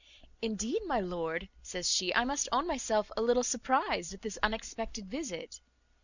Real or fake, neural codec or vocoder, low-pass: real; none; 7.2 kHz